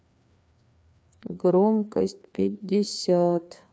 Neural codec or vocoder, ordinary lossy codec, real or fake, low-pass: codec, 16 kHz, 2 kbps, FreqCodec, larger model; none; fake; none